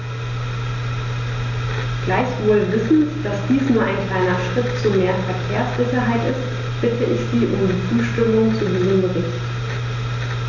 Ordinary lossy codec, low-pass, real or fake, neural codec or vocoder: none; 7.2 kHz; real; none